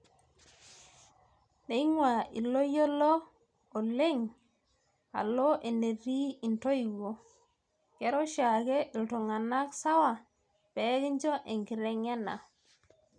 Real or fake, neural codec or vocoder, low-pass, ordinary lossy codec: real; none; 9.9 kHz; none